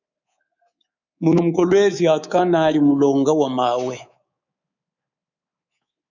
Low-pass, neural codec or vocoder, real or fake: 7.2 kHz; codec, 24 kHz, 3.1 kbps, DualCodec; fake